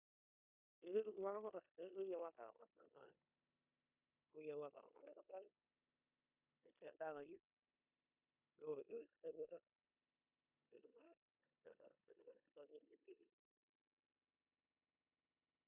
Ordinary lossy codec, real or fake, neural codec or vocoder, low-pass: none; fake; codec, 16 kHz in and 24 kHz out, 0.9 kbps, LongCat-Audio-Codec, four codebook decoder; 3.6 kHz